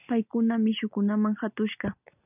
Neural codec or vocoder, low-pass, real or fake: none; 3.6 kHz; real